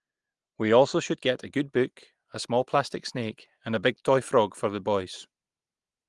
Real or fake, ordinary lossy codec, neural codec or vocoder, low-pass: real; Opus, 24 kbps; none; 10.8 kHz